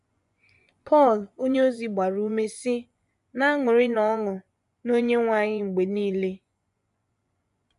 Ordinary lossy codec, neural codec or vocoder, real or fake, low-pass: none; vocoder, 24 kHz, 100 mel bands, Vocos; fake; 10.8 kHz